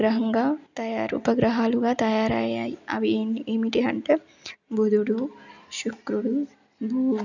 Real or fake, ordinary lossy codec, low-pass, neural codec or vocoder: real; none; 7.2 kHz; none